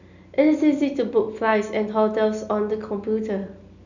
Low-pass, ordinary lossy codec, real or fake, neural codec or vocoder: 7.2 kHz; none; real; none